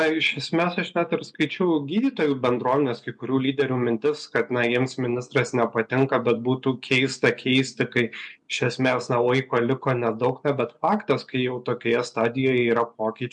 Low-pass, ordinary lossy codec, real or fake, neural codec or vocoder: 10.8 kHz; MP3, 64 kbps; real; none